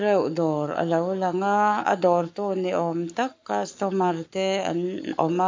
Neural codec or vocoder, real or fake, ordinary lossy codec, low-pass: codec, 44.1 kHz, 7.8 kbps, Pupu-Codec; fake; MP3, 48 kbps; 7.2 kHz